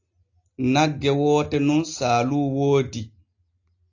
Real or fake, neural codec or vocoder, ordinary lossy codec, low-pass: real; none; AAC, 48 kbps; 7.2 kHz